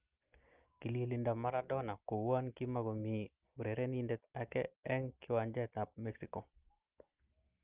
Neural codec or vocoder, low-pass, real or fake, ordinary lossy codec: none; 3.6 kHz; real; Opus, 32 kbps